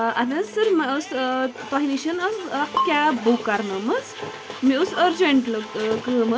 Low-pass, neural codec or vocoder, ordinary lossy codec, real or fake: none; none; none; real